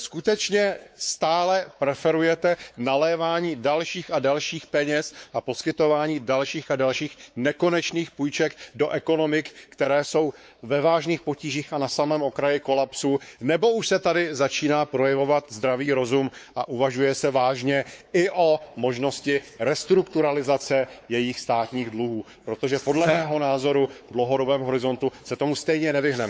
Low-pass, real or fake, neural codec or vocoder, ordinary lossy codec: none; fake; codec, 16 kHz, 4 kbps, X-Codec, WavLM features, trained on Multilingual LibriSpeech; none